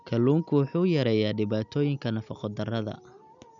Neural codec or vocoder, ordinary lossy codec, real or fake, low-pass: none; none; real; 7.2 kHz